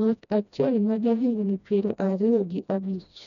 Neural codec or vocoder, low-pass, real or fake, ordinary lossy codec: codec, 16 kHz, 1 kbps, FreqCodec, smaller model; 7.2 kHz; fake; none